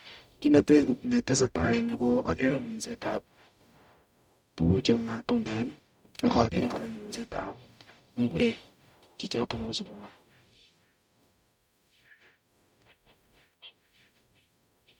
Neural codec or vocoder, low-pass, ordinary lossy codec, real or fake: codec, 44.1 kHz, 0.9 kbps, DAC; 19.8 kHz; none; fake